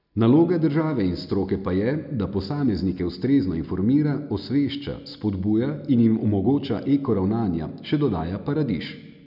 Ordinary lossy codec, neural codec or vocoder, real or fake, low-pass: none; none; real; 5.4 kHz